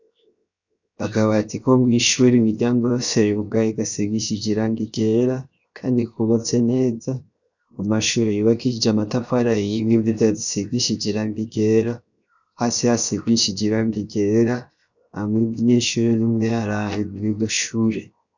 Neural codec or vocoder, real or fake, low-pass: codec, 16 kHz, 0.7 kbps, FocalCodec; fake; 7.2 kHz